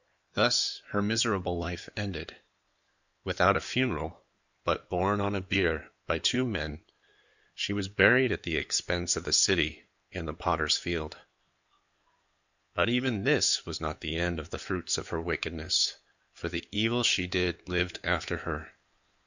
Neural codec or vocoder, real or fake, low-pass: codec, 16 kHz in and 24 kHz out, 2.2 kbps, FireRedTTS-2 codec; fake; 7.2 kHz